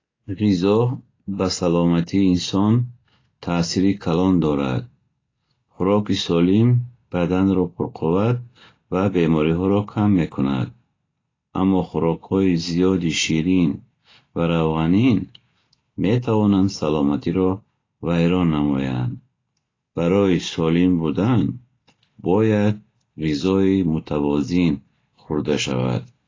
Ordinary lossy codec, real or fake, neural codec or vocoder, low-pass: AAC, 32 kbps; real; none; 7.2 kHz